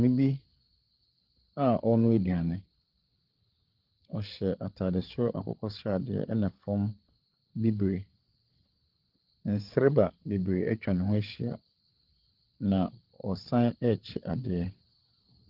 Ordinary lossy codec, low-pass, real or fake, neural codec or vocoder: Opus, 16 kbps; 5.4 kHz; fake; codec, 16 kHz, 8 kbps, FreqCodec, larger model